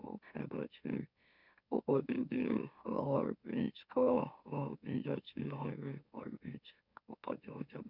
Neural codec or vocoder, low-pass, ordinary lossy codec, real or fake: autoencoder, 44.1 kHz, a latent of 192 numbers a frame, MeloTTS; 5.4 kHz; none; fake